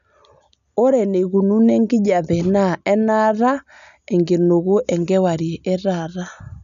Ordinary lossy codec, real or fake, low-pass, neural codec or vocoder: none; real; 7.2 kHz; none